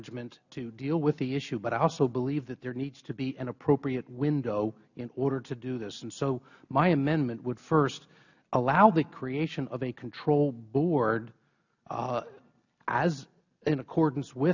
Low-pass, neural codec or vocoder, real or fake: 7.2 kHz; none; real